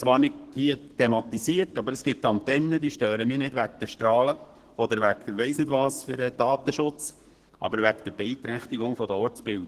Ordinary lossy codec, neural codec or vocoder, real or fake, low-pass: Opus, 16 kbps; codec, 32 kHz, 1.9 kbps, SNAC; fake; 14.4 kHz